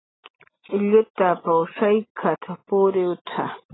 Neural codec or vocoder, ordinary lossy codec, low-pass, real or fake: none; AAC, 16 kbps; 7.2 kHz; real